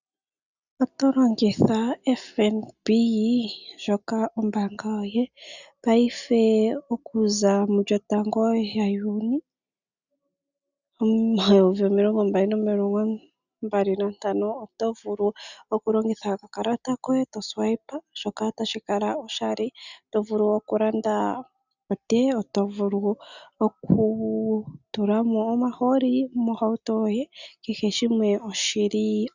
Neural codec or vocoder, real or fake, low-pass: none; real; 7.2 kHz